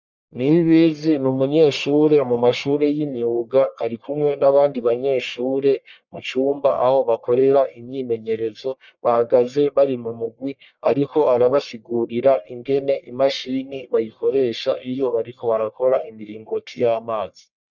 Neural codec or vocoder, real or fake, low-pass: codec, 44.1 kHz, 1.7 kbps, Pupu-Codec; fake; 7.2 kHz